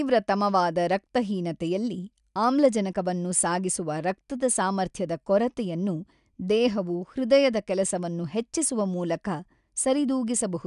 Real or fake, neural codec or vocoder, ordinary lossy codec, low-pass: real; none; none; 10.8 kHz